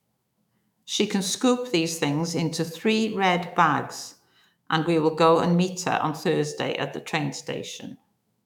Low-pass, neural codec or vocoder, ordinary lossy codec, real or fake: 19.8 kHz; autoencoder, 48 kHz, 128 numbers a frame, DAC-VAE, trained on Japanese speech; none; fake